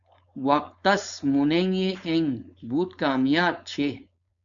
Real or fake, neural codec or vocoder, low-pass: fake; codec, 16 kHz, 4.8 kbps, FACodec; 7.2 kHz